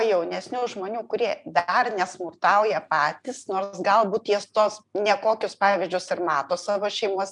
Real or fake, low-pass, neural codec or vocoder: real; 10.8 kHz; none